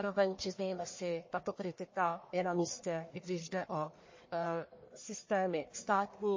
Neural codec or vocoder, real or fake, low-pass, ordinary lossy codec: codec, 44.1 kHz, 1.7 kbps, Pupu-Codec; fake; 7.2 kHz; MP3, 32 kbps